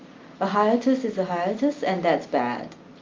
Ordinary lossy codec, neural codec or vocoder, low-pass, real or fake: Opus, 24 kbps; none; 7.2 kHz; real